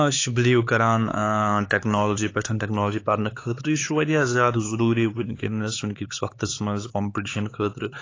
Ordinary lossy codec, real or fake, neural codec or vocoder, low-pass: AAC, 32 kbps; fake; codec, 16 kHz, 4 kbps, X-Codec, HuBERT features, trained on LibriSpeech; 7.2 kHz